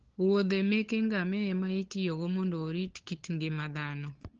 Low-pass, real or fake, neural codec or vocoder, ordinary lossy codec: 7.2 kHz; fake; codec, 16 kHz, 8 kbps, FunCodec, trained on LibriTTS, 25 frames a second; Opus, 16 kbps